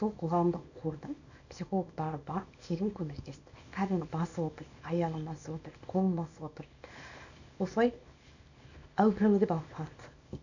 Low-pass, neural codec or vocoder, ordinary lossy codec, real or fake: 7.2 kHz; codec, 24 kHz, 0.9 kbps, WavTokenizer, small release; none; fake